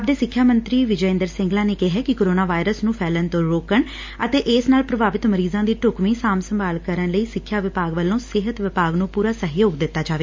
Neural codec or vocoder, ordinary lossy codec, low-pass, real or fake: none; MP3, 48 kbps; 7.2 kHz; real